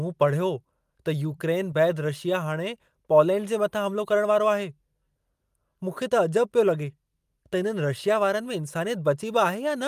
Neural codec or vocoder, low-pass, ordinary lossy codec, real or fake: none; 14.4 kHz; Opus, 32 kbps; real